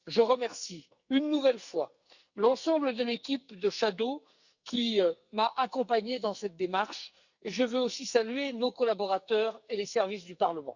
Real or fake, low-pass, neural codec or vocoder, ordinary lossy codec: fake; 7.2 kHz; codec, 44.1 kHz, 2.6 kbps, SNAC; Opus, 64 kbps